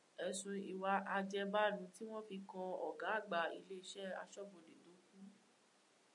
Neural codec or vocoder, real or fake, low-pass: none; real; 10.8 kHz